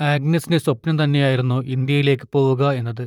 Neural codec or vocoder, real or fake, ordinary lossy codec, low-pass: vocoder, 44.1 kHz, 128 mel bands, Pupu-Vocoder; fake; none; 19.8 kHz